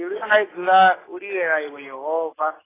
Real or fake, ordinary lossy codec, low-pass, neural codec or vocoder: real; AAC, 16 kbps; 3.6 kHz; none